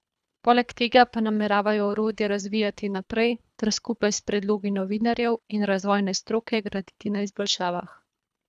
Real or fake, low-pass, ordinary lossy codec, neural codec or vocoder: fake; none; none; codec, 24 kHz, 3 kbps, HILCodec